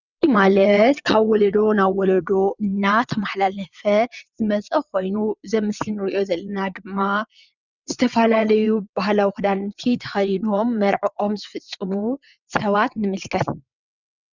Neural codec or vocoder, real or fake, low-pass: vocoder, 22.05 kHz, 80 mel bands, WaveNeXt; fake; 7.2 kHz